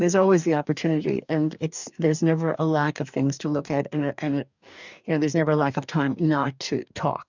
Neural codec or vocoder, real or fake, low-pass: codec, 44.1 kHz, 2.6 kbps, DAC; fake; 7.2 kHz